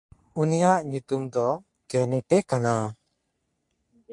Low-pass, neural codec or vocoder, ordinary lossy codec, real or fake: 10.8 kHz; codec, 44.1 kHz, 3.4 kbps, Pupu-Codec; MP3, 64 kbps; fake